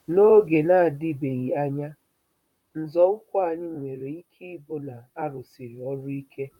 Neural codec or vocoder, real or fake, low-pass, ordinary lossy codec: vocoder, 44.1 kHz, 128 mel bands, Pupu-Vocoder; fake; 19.8 kHz; none